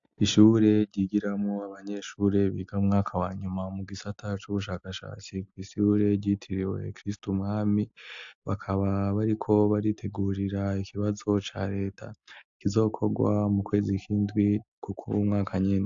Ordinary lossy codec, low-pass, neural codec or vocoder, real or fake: AAC, 64 kbps; 7.2 kHz; none; real